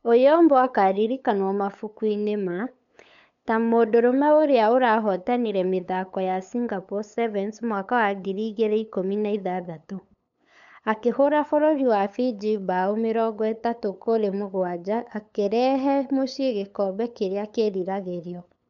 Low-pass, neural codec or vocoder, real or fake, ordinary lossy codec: 7.2 kHz; codec, 16 kHz, 8 kbps, FunCodec, trained on LibriTTS, 25 frames a second; fake; none